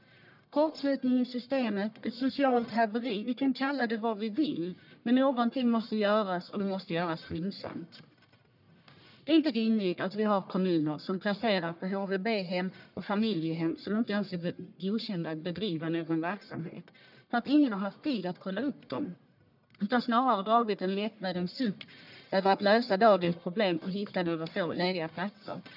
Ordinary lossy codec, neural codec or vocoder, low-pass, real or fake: none; codec, 44.1 kHz, 1.7 kbps, Pupu-Codec; 5.4 kHz; fake